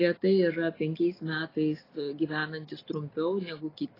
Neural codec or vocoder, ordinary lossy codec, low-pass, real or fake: none; AAC, 24 kbps; 5.4 kHz; real